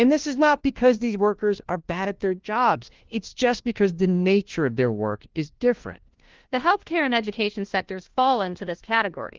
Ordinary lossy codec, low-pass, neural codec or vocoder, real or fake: Opus, 16 kbps; 7.2 kHz; codec, 16 kHz, 1 kbps, FunCodec, trained on LibriTTS, 50 frames a second; fake